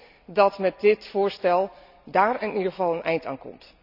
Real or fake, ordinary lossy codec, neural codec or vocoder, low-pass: real; none; none; 5.4 kHz